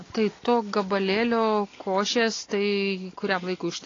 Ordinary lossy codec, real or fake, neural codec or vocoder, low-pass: AAC, 32 kbps; real; none; 7.2 kHz